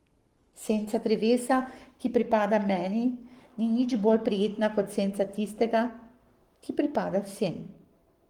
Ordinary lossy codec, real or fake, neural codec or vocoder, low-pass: Opus, 24 kbps; fake; codec, 44.1 kHz, 7.8 kbps, Pupu-Codec; 19.8 kHz